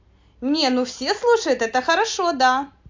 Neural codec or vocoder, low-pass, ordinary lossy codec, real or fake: none; 7.2 kHz; MP3, 48 kbps; real